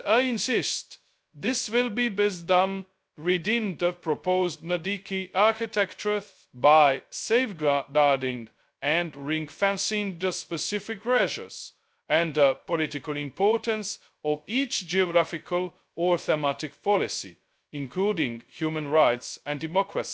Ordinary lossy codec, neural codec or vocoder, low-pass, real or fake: none; codec, 16 kHz, 0.2 kbps, FocalCodec; none; fake